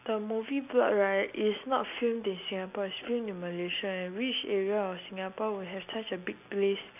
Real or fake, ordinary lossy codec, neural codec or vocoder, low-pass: real; none; none; 3.6 kHz